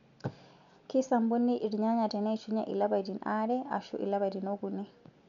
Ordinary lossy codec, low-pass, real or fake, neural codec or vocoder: none; 7.2 kHz; real; none